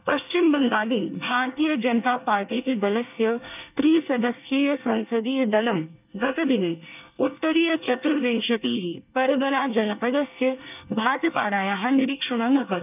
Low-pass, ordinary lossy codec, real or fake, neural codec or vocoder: 3.6 kHz; none; fake; codec, 24 kHz, 1 kbps, SNAC